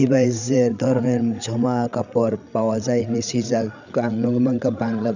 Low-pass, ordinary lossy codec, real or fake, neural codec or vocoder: 7.2 kHz; none; fake; codec, 16 kHz, 8 kbps, FreqCodec, larger model